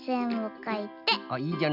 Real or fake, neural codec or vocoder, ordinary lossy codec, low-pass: real; none; none; 5.4 kHz